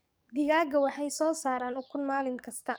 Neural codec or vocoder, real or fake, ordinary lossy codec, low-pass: codec, 44.1 kHz, 7.8 kbps, DAC; fake; none; none